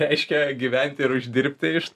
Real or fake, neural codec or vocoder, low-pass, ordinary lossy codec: real; none; 14.4 kHz; MP3, 96 kbps